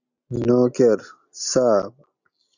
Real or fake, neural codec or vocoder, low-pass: real; none; 7.2 kHz